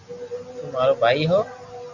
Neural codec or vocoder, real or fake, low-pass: none; real; 7.2 kHz